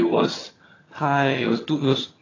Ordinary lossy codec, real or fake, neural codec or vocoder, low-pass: AAC, 32 kbps; fake; vocoder, 22.05 kHz, 80 mel bands, HiFi-GAN; 7.2 kHz